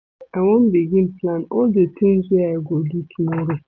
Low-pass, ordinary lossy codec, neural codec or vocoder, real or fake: none; none; none; real